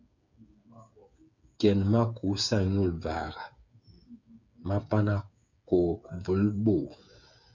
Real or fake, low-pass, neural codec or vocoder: fake; 7.2 kHz; codec, 16 kHz, 8 kbps, FreqCodec, smaller model